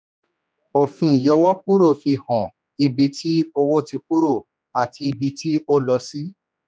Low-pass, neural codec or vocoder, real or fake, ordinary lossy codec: none; codec, 16 kHz, 2 kbps, X-Codec, HuBERT features, trained on general audio; fake; none